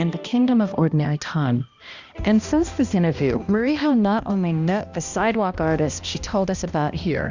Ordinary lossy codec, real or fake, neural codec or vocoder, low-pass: Opus, 64 kbps; fake; codec, 16 kHz, 1 kbps, X-Codec, HuBERT features, trained on balanced general audio; 7.2 kHz